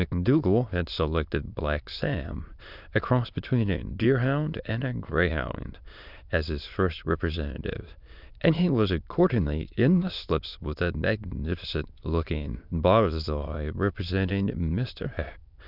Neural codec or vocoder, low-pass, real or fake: autoencoder, 22.05 kHz, a latent of 192 numbers a frame, VITS, trained on many speakers; 5.4 kHz; fake